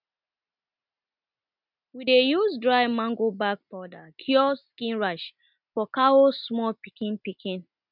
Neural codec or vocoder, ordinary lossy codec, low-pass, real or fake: none; none; 5.4 kHz; real